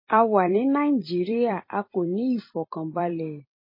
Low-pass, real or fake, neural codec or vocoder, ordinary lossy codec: 5.4 kHz; fake; vocoder, 44.1 kHz, 128 mel bands every 256 samples, BigVGAN v2; MP3, 24 kbps